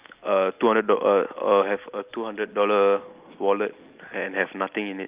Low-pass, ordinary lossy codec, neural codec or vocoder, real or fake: 3.6 kHz; Opus, 24 kbps; none; real